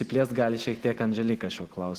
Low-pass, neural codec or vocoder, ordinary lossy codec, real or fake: 14.4 kHz; none; Opus, 16 kbps; real